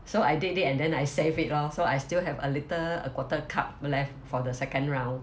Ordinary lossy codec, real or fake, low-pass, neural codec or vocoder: none; real; none; none